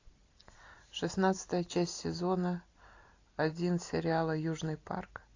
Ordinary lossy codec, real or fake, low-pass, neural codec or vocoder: AAC, 48 kbps; real; 7.2 kHz; none